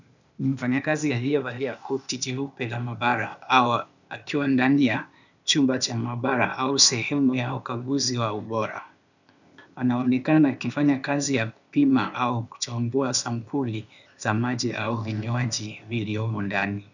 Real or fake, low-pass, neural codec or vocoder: fake; 7.2 kHz; codec, 16 kHz, 0.8 kbps, ZipCodec